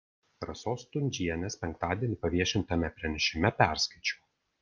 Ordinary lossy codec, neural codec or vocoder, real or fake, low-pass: Opus, 24 kbps; none; real; 7.2 kHz